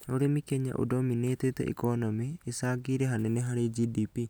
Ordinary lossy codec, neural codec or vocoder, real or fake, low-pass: none; none; real; none